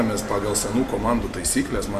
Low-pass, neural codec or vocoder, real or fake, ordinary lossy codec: 14.4 kHz; none; real; MP3, 96 kbps